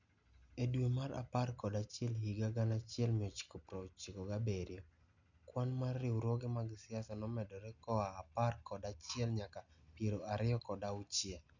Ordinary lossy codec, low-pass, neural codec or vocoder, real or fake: none; 7.2 kHz; none; real